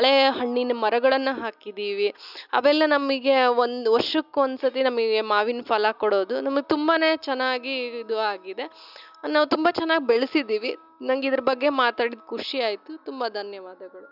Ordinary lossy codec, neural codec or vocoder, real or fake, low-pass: none; none; real; 5.4 kHz